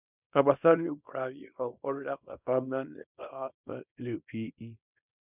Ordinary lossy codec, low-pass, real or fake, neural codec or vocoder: none; 3.6 kHz; fake; codec, 24 kHz, 0.9 kbps, WavTokenizer, small release